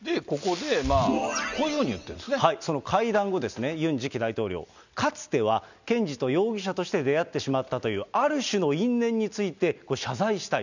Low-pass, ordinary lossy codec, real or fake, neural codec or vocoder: 7.2 kHz; none; real; none